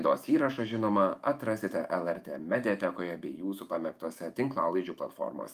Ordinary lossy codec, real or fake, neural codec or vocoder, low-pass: Opus, 32 kbps; fake; autoencoder, 48 kHz, 128 numbers a frame, DAC-VAE, trained on Japanese speech; 14.4 kHz